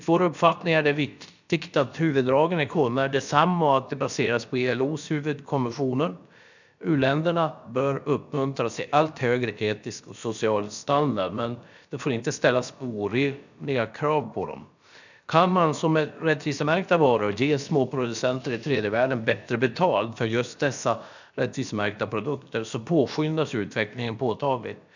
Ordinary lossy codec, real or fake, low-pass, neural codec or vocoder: none; fake; 7.2 kHz; codec, 16 kHz, about 1 kbps, DyCAST, with the encoder's durations